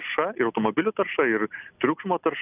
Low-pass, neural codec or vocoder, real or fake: 3.6 kHz; none; real